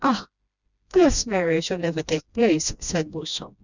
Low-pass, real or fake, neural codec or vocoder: 7.2 kHz; fake; codec, 16 kHz, 1 kbps, FreqCodec, smaller model